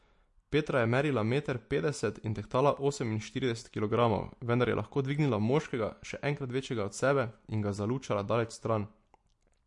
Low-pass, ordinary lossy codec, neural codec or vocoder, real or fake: 10.8 kHz; MP3, 48 kbps; none; real